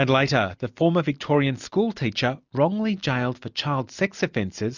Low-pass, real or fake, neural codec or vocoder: 7.2 kHz; real; none